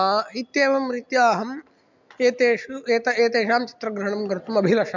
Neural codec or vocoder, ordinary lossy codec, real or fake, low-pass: none; MP3, 64 kbps; real; 7.2 kHz